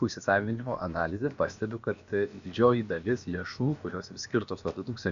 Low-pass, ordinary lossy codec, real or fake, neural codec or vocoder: 7.2 kHz; AAC, 96 kbps; fake; codec, 16 kHz, about 1 kbps, DyCAST, with the encoder's durations